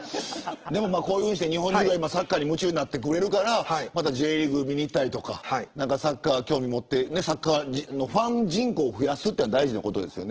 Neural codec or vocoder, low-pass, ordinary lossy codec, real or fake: none; 7.2 kHz; Opus, 16 kbps; real